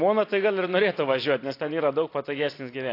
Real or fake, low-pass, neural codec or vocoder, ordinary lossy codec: real; 5.4 kHz; none; AAC, 32 kbps